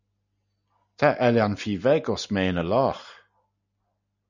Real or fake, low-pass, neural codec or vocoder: real; 7.2 kHz; none